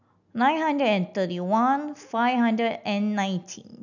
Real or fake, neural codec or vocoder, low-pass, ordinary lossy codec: real; none; 7.2 kHz; none